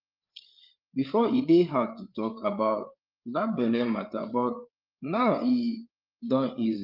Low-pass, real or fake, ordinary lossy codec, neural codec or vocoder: 5.4 kHz; fake; Opus, 24 kbps; codec, 16 kHz, 8 kbps, FreqCodec, larger model